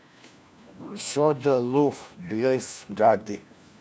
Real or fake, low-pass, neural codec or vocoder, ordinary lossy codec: fake; none; codec, 16 kHz, 1 kbps, FunCodec, trained on LibriTTS, 50 frames a second; none